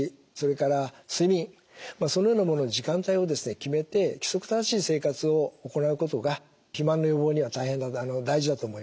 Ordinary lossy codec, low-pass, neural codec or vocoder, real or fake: none; none; none; real